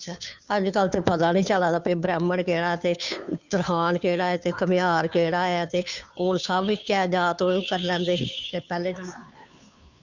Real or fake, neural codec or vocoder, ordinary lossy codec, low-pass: fake; codec, 16 kHz, 2 kbps, FunCodec, trained on Chinese and English, 25 frames a second; Opus, 64 kbps; 7.2 kHz